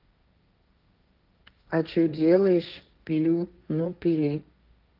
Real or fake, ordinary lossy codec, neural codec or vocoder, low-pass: fake; Opus, 24 kbps; codec, 16 kHz, 1.1 kbps, Voila-Tokenizer; 5.4 kHz